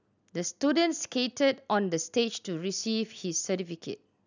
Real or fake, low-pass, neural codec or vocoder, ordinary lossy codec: real; 7.2 kHz; none; none